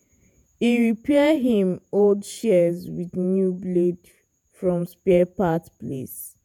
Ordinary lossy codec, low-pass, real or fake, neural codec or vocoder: none; 19.8 kHz; fake; vocoder, 48 kHz, 128 mel bands, Vocos